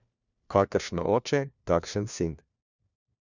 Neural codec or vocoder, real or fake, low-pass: codec, 16 kHz, 1 kbps, FunCodec, trained on LibriTTS, 50 frames a second; fake; 7.2 kHz